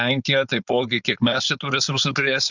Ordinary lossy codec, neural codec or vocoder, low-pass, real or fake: Opus, 64 kbps; codec, 16 kHz, 4.8 kbps, FACodec; 7.2 kHz; fake